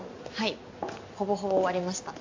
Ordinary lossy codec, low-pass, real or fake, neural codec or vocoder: AAC, 48 kbps; 7.2 kHz; real; none